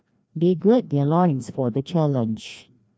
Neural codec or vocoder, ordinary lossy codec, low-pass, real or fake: codec, 16 kHz, 1 kbps, FreqCodec, larger model; none; none; fake